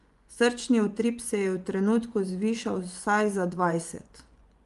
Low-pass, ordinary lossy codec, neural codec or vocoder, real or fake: 10.8 kHz; Opus, 32 kbps; vocoder, 24 kHz, 100 mel bands, Vocos; fake